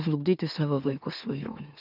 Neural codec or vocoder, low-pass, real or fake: autoencoder, 44.1 kHz, a latent of 192 numbers a frame, MeloTTS; 5.4 kHz; fake